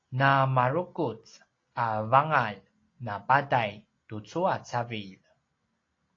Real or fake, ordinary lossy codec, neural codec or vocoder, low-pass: real; AAC, 32 kbps; none; 7.2 kHz